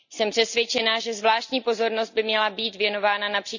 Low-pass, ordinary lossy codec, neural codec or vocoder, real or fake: 7.2 kHz; none; none; real